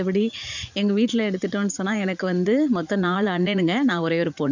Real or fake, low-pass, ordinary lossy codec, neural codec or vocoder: fake; 7.2 kHz; none; vocoder, 44.1 kHz, 80 mel bands, Vocos